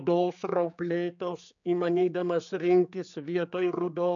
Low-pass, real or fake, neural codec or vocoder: 7.2 kHz; fake; codec, 16 kHz, 4 kbps, X-Codec, HuBERT features, trained on general audio